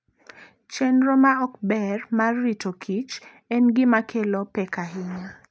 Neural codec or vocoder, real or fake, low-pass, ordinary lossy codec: none; real; none; none